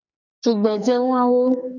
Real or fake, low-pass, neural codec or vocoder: fake; 7.2 kHz; codec, 44.1 kHz, 2.6 kbps, SNAC